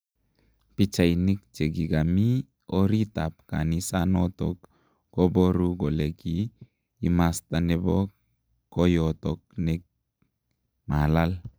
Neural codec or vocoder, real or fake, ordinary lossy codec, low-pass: none; real; none; none